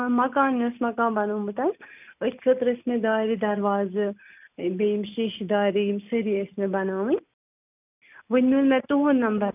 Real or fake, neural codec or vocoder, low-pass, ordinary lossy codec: real; none; 3.6 kHz; none